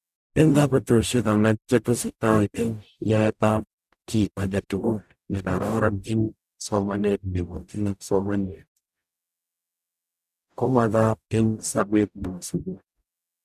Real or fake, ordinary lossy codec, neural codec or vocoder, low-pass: fake; none; codec, 44.1 kHz, 0.9 kbps, DAC; 14.4 kHz